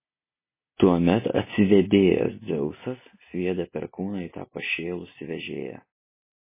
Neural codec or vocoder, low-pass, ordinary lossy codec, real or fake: none; 3.6 kHz; MP3, 16 kbps; real